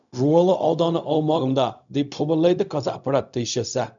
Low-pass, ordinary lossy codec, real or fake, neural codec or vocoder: 7.2 kHz; none; fake; codec, 16 kHz, 0.4 kbps, LongCat-Audio-Codec